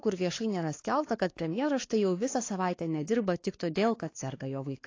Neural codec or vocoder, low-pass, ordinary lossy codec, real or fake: codec, 16 kHz, 4 kbps, X-Codec, WavLM features, trained on Multilingual LibriSpeech; 7.2 kHz; AAC, 32 kbps; fake